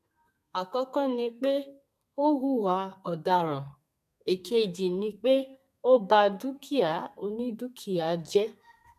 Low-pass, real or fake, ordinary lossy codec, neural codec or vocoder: 14.4 kHz; fake; none; codec, 32 kHz, 1.9 kbps, SNAC